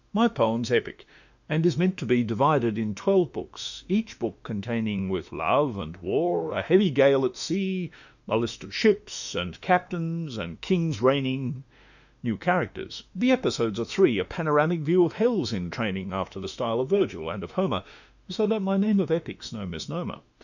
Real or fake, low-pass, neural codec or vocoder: fake; 7.2 kHz; autoencoder, 48 kHz, 32 numbers a frame, DAC-VAE, trained on Japanese speech